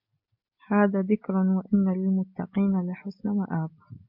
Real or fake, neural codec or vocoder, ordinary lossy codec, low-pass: real; none; MP3, 48 kbps; 5.4 kHz